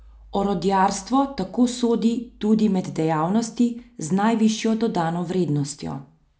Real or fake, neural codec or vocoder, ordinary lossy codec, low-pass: real; none; none; none